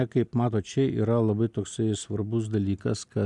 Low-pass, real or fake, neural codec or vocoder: 10.8 kHz; real; none